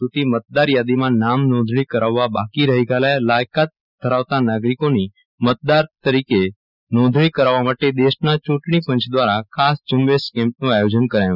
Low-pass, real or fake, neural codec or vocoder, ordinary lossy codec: 5.4 kHz; real; none; none